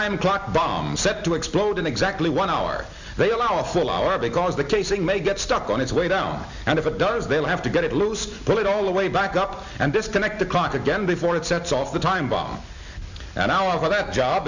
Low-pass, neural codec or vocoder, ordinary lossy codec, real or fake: 7.2 kHz; none; Opus, 64 kbps; real